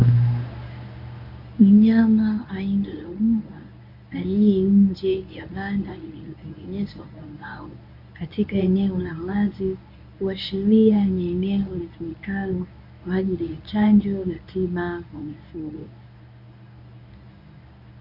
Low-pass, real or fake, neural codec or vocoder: 5.4 kHz; fake; codec, 24 kHz, 0.9 kbps, WavTokenizer, medium speech release version 1